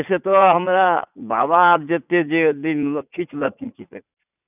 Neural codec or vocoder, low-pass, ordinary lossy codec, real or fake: vocoder, 44.1 kHz, 80 mel bands, Vocos; 3.6 kHz; none; fake